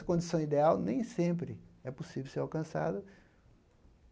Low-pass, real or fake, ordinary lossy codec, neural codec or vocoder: none; real; none; none